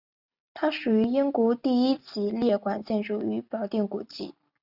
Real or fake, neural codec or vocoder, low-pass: real; none; 5.4 kHz